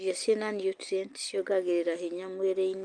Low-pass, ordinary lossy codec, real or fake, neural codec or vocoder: 9.9 kHz; Opus, 32 kbps; real; none